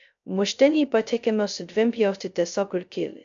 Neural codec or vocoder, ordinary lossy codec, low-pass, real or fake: codec, 16 kHz, 0.2 kbps, FocalCodec; AAC, 64 kbps; 7.2 kHz; fake